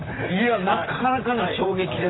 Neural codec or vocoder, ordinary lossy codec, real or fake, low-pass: vocoder, 44.1 kHz, 128 mel bands, Pupu-Vocoder; AAC, 16 kbps; fake; 7.2 kHz